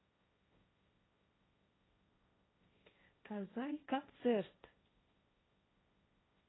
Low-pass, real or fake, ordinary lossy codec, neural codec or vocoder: 7.2 kHz; fake; AAC, 16 kbps; codec, 16 kHz, 1.1 kbps, Voila-Tokenizer